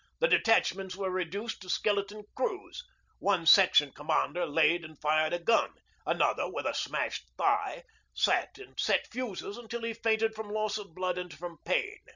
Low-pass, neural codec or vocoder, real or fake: 7.2 kHz; none; real